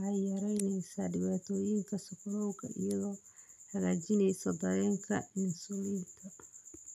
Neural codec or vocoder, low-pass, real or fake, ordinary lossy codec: vocoder, 44.1 kHz, 128 mel bands every 256 samples, BigVGAN v2; 14.4 kHz; fake; none